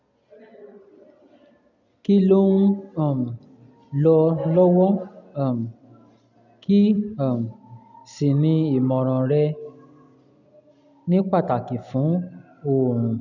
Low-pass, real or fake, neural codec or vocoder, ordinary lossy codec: 7.2 kHz; real; none; none